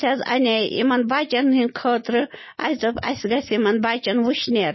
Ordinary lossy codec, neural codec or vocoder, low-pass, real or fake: MP3, 24 kbps; none; 7.2 kHz; real